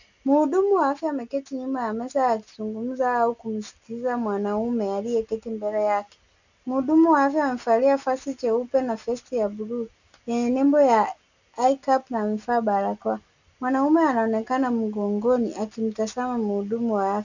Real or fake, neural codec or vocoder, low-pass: real; none; 7.2 kHz